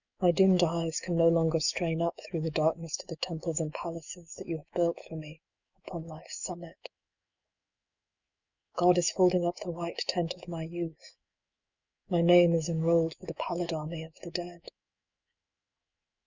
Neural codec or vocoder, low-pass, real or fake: autoencoder, 48 kHz, 128 numbers a frame, DAC-VAE, trained on Japanese speech; 7.2 kHz; fake